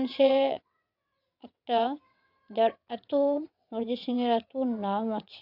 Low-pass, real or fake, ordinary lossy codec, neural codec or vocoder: 5.4 kHz; fake; none; vocoder, 22.05 kHz, 80 mel bands, WaveNeXt